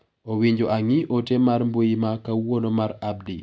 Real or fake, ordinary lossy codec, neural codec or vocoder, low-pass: real; none; none; none